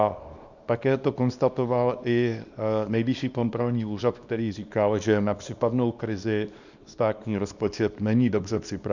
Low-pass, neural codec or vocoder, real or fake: 7.2 kHz; codec, 24 kHz, 0.9 kbps, WavTokenizer, small release; fake